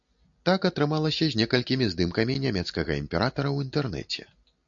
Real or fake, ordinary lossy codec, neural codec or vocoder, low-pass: real; AAC, 64 kbps; none; 7.2 kHz